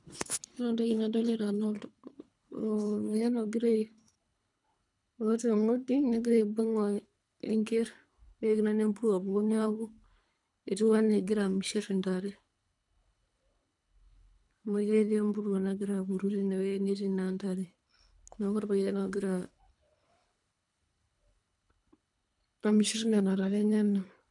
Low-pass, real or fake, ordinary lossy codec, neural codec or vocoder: 10.8 kHz; fake; none; codec, 24 kHz, 3 kbps, HILCodec